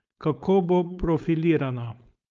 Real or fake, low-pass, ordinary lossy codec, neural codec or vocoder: fake; 7.2 kHz; Opus, 24 kbps; codec, 16 kHz, 4.8 kbps, FACodec